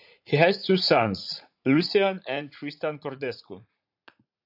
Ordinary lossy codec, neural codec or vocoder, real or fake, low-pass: AAC, 48 kbps; vocoder, 44.1 kHz, 80 mel bands, Vocos; fake; 5.4 kHz